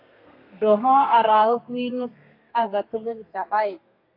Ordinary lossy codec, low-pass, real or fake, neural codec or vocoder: AAC, 32 kbps; 5.4 kHz; fake; codec, 32 kHz, 1.9 kbps, SNAC